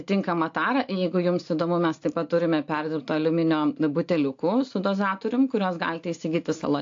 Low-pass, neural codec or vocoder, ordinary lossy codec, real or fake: 7.2 kHz; none; AAC, 48 kbps; real